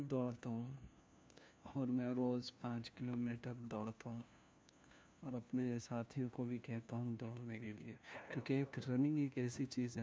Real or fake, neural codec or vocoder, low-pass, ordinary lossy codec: fake; codec, 16 kHz, 1 kbps, FunCodec, trained on LibriTTS, 50 frames a second; none; none